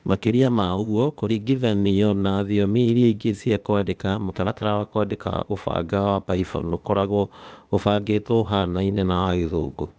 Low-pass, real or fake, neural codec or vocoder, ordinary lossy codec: none; fake; codec, 16 kHz, 0.8 kbps, ZipCodec; none